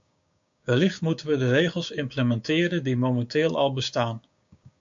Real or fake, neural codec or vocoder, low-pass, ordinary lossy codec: fake; codec, 16 kHz, 6 kbps, DAC; 7.2 kHz; AAC, 64 kbps